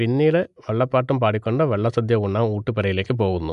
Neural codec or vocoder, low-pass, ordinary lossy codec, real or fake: none; 10.8 kHz; none; real